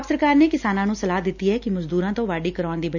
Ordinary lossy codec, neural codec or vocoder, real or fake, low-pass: none; none; real; 7.2 kHz